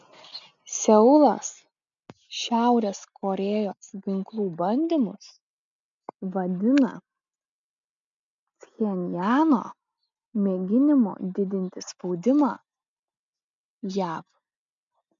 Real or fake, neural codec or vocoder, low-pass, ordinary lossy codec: real; none; 7.2 kHz; MP3, 64 kbps